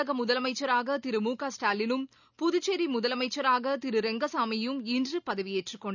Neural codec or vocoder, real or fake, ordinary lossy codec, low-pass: none; real; none; 7.2 kHz